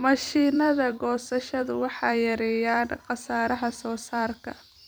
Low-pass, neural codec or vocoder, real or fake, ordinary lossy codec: none; vocoder, 44.1 kHz, 128 mel bands every 256 samples, BigVGAN v2; fake; none